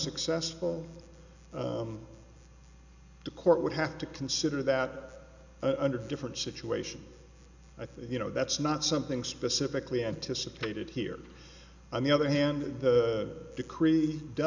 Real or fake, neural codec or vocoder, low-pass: real; none; 7.2 kHz